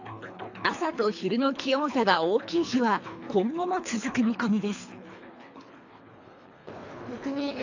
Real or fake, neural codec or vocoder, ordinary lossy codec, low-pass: fake; codec, 24 kHz, 3 kbps, HILCodec; AAC, 48 kbps; 7.2 kHz